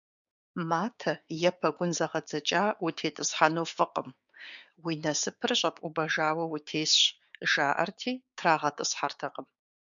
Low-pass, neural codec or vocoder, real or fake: 7.2 kHz; codec, 16 kHz, 6 kbps, DAC; fake